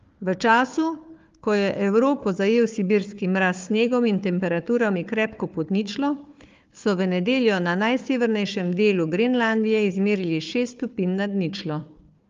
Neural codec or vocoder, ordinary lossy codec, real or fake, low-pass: codec, 16 kHz, 4 kbps, FunCodec, trained on Chinese and English, 50 frames a second; Opus, 32 kbps; fake; 7.2 kHz